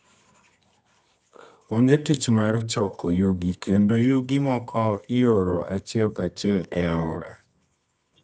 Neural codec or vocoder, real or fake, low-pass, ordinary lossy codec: codec, 24 kHz, 0.9 kbps, WavTokenizer, medium music audio release; fake; 10.8 kHz; none